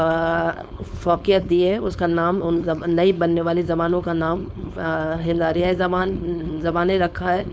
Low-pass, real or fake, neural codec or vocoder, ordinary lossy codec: none; fake; codec, 16 kHz, 4.8 kbps, FACodec; none